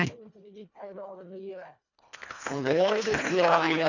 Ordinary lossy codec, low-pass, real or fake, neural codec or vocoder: none; 7.2 kHz; fake; codec, 24 kHz, 1.5 kbps, HILCodec